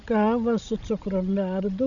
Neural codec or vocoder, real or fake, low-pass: codec, 16 kHz, 16 kbps, FreqCodec, larger model; fake; 7.2 kHz